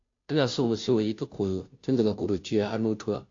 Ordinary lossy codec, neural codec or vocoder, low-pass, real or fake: AAC, 48 kbps; codec, 16 kHz, 0.5 kbps, FunCodec, trained on Chinese and English, 25 frames a second; 7.2 kHz; fake